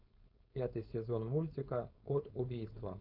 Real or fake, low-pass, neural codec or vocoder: fake; 5.4 kHz; codec, 16 kHz, 4.8 kbps, FACodec